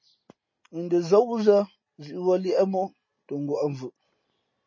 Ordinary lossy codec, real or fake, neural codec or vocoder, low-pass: MP3, 32 kbps; real; none; 7.2 kHz